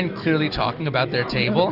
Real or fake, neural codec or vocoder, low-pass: real; none; 5.4 kHz